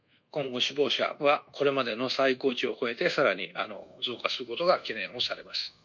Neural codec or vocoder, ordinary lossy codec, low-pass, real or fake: codec, 24 kHz, 1.2 kbps, DualCodec; none; 7.2 kHz; fake